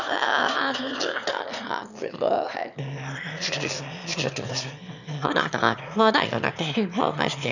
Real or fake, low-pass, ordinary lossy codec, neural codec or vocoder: fake; 7.2 kHz; none; autoencoder, 22.05 kHz, a latent of 192 numbers a frame, VITS, trained on one speaker